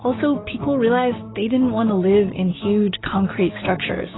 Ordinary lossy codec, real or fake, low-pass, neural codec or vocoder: AAC, 16 kbps; fake; 7.2 kHz; codec, 16 kHz, 6 kbps, DAC